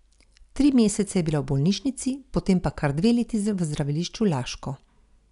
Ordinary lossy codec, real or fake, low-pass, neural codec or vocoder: none; real; 10.8 kHz; none